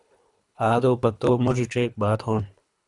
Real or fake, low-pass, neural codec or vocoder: fake; 10.8 kHz; codec, 24 kHz, 3 kbps, HILCodec